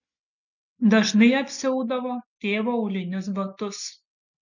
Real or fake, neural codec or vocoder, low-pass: real; none; 7.2 kHz